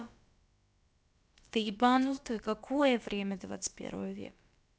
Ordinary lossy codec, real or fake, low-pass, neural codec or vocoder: none; fake; none; codec, 16 kHz, about 1 kbps, DyCAST, with the encoder's durations